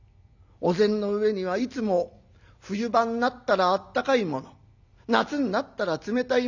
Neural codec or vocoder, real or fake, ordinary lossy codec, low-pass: none; real; none; 7.2 kHz